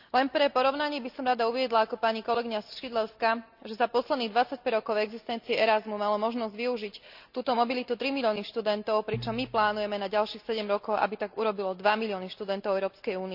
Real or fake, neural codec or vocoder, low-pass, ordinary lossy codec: real; none; 5.4 kHz; none